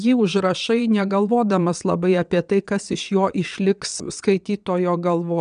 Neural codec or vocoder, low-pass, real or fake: vocoder, 22.05 kHz, 80 mel bands, WaveNeXt; 9.9 kHz; fake